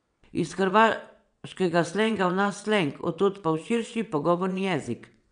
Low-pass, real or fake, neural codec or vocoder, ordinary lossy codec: 9.9 kHz; fake; vocoder, 22.05 kHz, 80 mel bands, WaveNeXt; none